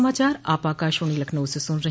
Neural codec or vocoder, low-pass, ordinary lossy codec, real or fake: none; none; none; real